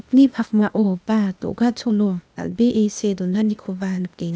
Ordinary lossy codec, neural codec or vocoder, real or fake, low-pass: none; codec, 16 kHz, 0.8 kbps, ZipCodec; fake; none